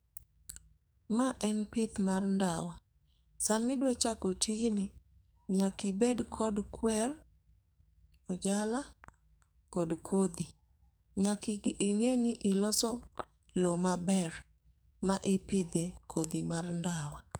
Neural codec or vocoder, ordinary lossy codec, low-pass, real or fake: codec, 44.1 kHz, 2.6 kbps, SNAC; none; none; fake